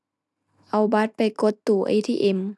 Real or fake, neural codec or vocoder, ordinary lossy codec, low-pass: real; none; none; none